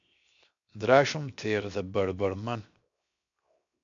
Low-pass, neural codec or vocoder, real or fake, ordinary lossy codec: 7.2 kHz; codec, 16 kHz, 0.7 kbps, FocalCodec; fake; MP3, 64 kbps